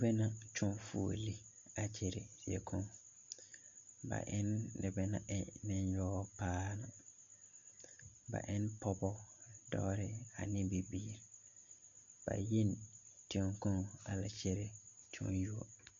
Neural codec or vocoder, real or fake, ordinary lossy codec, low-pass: none; real; MP3, 48 kbps; 7.2 kHz